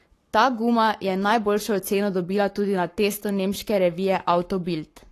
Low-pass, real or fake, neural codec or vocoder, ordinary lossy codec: 14.4 kHz; fake; codec, 44.1 kHz, 7.8 kbps, Pupu-Codec; AAC, 48 kbps